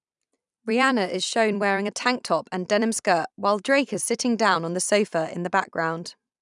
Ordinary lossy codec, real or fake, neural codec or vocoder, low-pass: none; fake; vocoder, 44.1 kHz, 128 mel bands every 256 samples, BigVGAN v2; 10.8 kHz